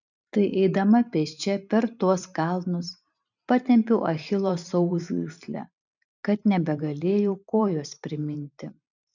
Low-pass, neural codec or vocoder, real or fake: 7.2 kHz; vocoder, 44.1 kHz, 128 mel bands every 512 samples, BigVGAN v2; fake